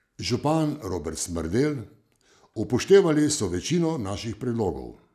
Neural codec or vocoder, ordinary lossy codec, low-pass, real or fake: none; none; 14.4 kHz; real